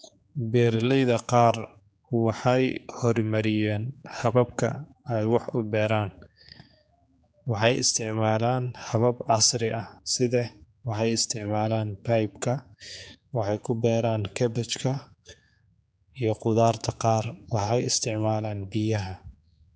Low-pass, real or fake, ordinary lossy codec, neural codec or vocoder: none; fake; none; codec, 16 kHz, 4 kbps, X-Codec, HuBERT features, trained on general audio